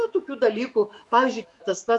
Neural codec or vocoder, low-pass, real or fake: autoencoder, 48 kHz, 128 numbers a frame, DAC-VAE, trained on Japanese speech; 10.8 kHz; fake